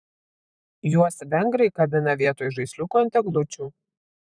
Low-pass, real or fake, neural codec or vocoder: 9.9 kHz; fake; vocoder, 44.1 kHz, 128 mel bands, Pupu-Vocoder